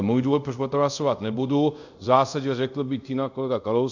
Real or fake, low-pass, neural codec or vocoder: fake; 7.2 kHz; codec, 24 kHz, 0.5 kbps, DualCodec